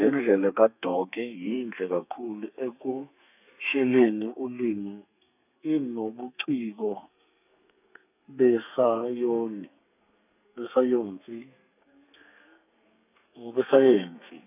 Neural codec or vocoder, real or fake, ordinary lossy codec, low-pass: codec, 32 kHz, 1.9 kbps, SNAC; fake; none; 3.6 kHz